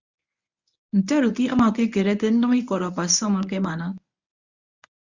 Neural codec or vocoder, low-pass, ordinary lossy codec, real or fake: codec, 24 kHz, 0.9 kbps, WavTokenizer, medium speech release version 1; 7.2 kHz; Opus, 64 kbps; fake